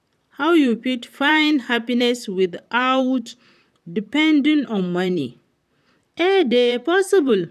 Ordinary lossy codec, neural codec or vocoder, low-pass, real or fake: none; vocoder, 44.1 kHz, 128 mel bands, Pupu-Vocoder; 14.4 kHz; fake